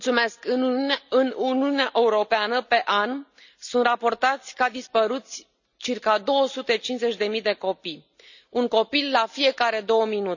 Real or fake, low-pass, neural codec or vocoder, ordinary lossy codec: real; 7.2 kHz; none; none